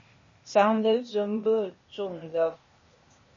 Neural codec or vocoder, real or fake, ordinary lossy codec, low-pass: codec, 16 kHz, 0.8 kbps, ZipCodec; fake; MP3, 32 kbps; 7.2 kHz